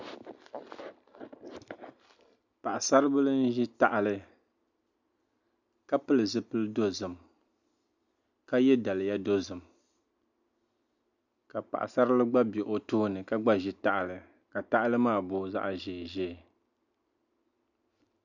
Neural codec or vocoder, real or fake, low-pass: none; real; 7.2 kHz